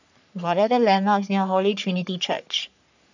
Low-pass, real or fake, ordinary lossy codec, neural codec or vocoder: 7.2 kHz; fake; none; codec, 44.1 kHz, 3.4 kbps, Pupu-Codec